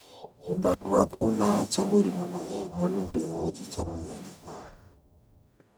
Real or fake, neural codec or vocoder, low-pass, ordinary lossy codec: fake; codec, 44.1 kHz, 0.9 kbps, DAC; none; none